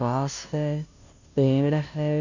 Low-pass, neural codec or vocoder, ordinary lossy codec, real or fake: 7.2 kHz; codec, 16 kHz, 0.5 kbps, FunCodec, trained on Chinese and English, 25 frames a second; none; fake